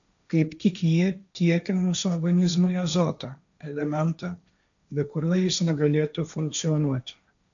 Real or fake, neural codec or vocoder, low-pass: fake; codec, 16 kHz, 1.1 kbps, Voila-Tokenizer; 7.2 kHz